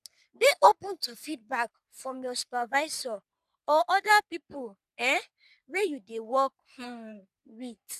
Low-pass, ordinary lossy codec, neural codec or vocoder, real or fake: 14.4 kHz; none; codec, 44.1 kHz, 3.4 kbps, Pupu-Codec; fake